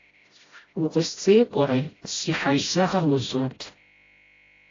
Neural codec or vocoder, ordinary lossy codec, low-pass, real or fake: codec, 16 kHz, 0.5 kbps, FreqCodec, smaller model; AAC, 32 kbps; 7.2 kHz; fake